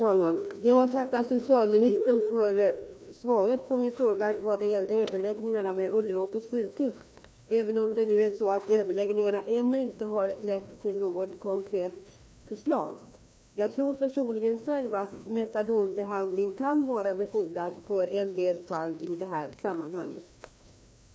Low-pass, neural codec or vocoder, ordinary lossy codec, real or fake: none; codec, 16 kHz, 1 kbps, FreqCodec, larger model; none; fake